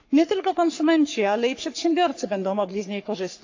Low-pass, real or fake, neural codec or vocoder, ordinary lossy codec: 7.2 kHz; fake; codec, 44.1 kHz, 3.4 kbps, Pupu-Codec; AAC, 48 kbps